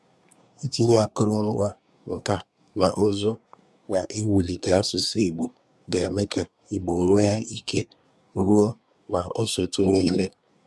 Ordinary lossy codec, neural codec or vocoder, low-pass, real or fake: none; codec, 24 kHz, 1 kbps, SNAC; none; fake